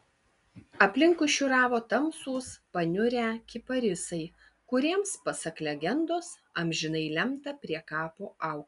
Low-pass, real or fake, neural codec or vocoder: 10.8 kHz; real; none